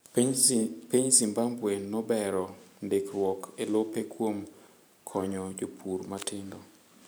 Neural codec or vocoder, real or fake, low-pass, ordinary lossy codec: vocoder, 44.1 kHz, 128 mel bands every 512 samples, BigVGAN v2; fake; none; none